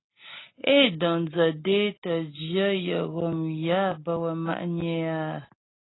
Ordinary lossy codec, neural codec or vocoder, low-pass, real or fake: AAC, 16 kbps; none; 7.2 kHz; real